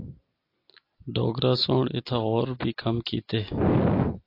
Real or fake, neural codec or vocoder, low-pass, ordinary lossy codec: real; none; 5.4 kHz; AAC, 32 kbps